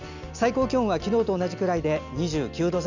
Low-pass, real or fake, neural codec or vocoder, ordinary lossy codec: 7.2 kHz; real; none; none